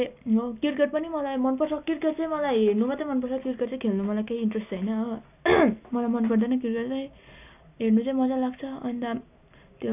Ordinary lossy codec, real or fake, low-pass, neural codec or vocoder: none; real; 3.6 kHz; none